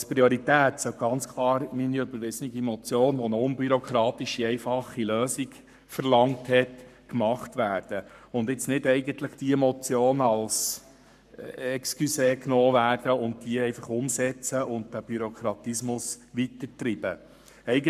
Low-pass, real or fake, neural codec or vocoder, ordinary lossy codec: 14.4 kHz; fake; codec, 44.1 kHz, 7.8 kbps, Pupu-Codec; none